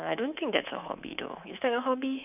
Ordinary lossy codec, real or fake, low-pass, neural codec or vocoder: none; fake; 3.6 kHz; codec, 24 kHz, 3.1 kbps, DualCodec